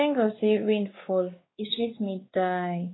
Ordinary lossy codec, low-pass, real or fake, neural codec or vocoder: AAC, 16 kbps; 7.2 kHz; fake; codec, 16 kHz, 2 kbps, X-Codec, WavLM features, trained on Multilingual LibriSpeech